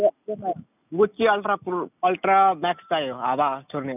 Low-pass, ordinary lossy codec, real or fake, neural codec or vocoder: 3.6 kHz; none; real; none